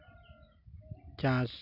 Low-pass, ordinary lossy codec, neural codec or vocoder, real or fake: 5.4 kHz; none; none; real